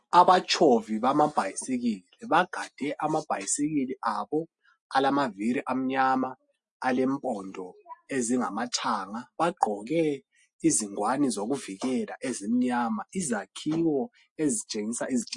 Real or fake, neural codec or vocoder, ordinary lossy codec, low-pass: real; none; MP3, 48 kbps; 10.8 kHz